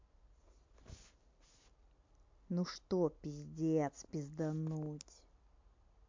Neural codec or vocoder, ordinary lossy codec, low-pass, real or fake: none; MP3, 48 kbps; 7.2 kHz; real